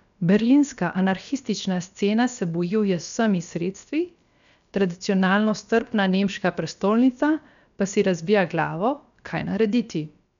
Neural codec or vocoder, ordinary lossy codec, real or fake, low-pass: codec, 16 kHz, about 1 kbps, DyCAST, with the encoder's durations; none; fake; 7.2 kHz